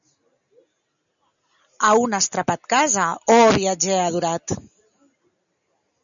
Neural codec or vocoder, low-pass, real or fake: none; 7.2 kHz; real